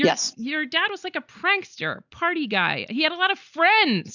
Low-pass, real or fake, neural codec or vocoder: 7.2 kHz; real; none